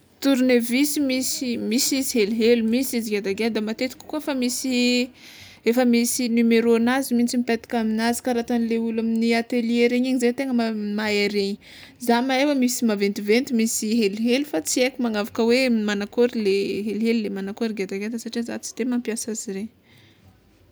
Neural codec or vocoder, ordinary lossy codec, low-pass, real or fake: none; none; none; real